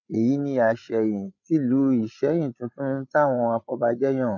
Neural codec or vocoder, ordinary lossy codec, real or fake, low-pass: codec, 16 kHz, 8 kbps, FreqCodec, larger model; none; fake; 7.2 kHz